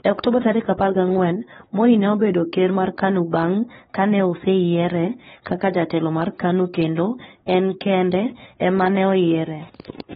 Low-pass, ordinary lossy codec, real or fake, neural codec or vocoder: 7.2 kHz; AAC, 16 kbps; fake; codec, 16 kHz, 4 kbps, X-Codec, WavLM features, trained on Multilingual LibriSpeech